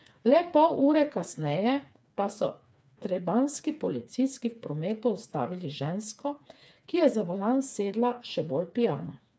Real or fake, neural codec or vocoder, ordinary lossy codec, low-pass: fake; codec, 16 kHz, 4 kbps, FreqCodec, smaller model; none; none